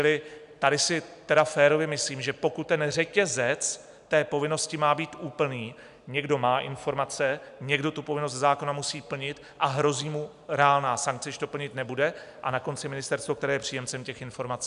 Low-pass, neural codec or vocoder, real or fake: 10.8 kHz; none; real